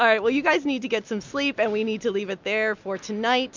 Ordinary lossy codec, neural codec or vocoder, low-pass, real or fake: MP3, 64 kbps; none; 7.2 kHz; real